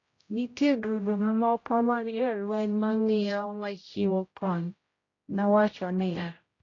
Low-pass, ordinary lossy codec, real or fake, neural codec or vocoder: 7.2 kHz; AAC, 32 kbps; fake; codec, 16 kHz, 0.5 kbps, X-Codec, HuBERT features, trained on general audio